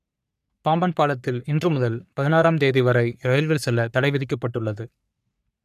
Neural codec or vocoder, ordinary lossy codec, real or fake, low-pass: codec, 44.1 kHz, 3.4 kbps, Pupu-Codec; none; fake; 14.4 kHz